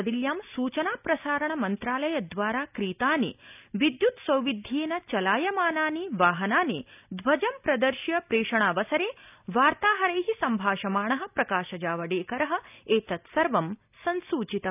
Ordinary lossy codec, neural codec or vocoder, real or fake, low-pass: none; none; real; 3.6 kHz